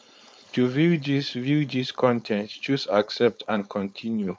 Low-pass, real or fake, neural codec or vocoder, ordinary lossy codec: none; fake; codec, 16 kHz, 4.8 kbps, FACodec; none